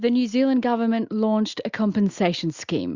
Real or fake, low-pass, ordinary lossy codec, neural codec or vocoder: real; 7.2 kHz; Opus, 64 kbps; none